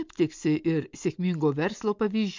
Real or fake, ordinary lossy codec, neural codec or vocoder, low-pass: real; MP3, 64 kbps; none; 7.2 kHz